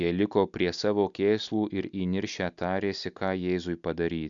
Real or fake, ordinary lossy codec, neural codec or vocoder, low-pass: real; MP3, 96 kbps; none; 7.2 kHz